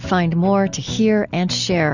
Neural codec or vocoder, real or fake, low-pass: none; real; 7.2 kHz